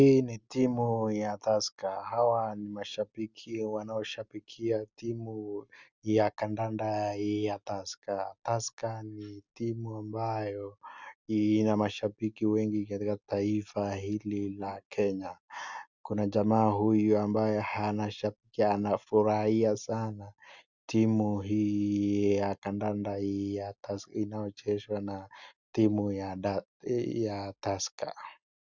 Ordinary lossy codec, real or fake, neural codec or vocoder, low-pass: Opus, 64 kbps; real; none; 7.2 kHz